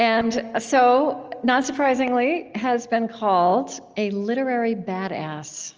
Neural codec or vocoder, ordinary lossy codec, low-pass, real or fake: none; Opus, 24 kbps; 7.2 kHz; real